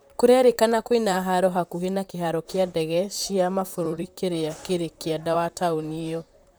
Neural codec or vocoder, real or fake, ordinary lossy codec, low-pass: vocoder, 44.1 kHz, 128 mel bands, Pupu-Vocoder; fake; none; none